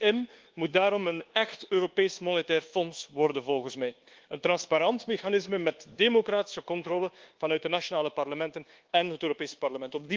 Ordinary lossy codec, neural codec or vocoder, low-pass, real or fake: Opus, 16 kbps; codec, 24 kHz, 1.2 kbps, DualCodec; 7.2 kHz; fake